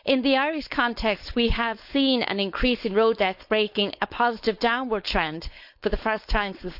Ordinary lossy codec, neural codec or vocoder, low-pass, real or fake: none; codec, 16 kHz, 4.8 kbps, FACodec; 5.4 kHz; fake